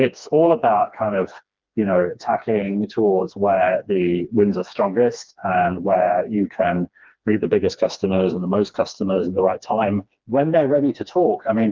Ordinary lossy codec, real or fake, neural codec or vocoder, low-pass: Opus, 32 kbps; fake; codec, 16 kHz, 2 kbps, FreqCodec, smaller model; 7.2 kHz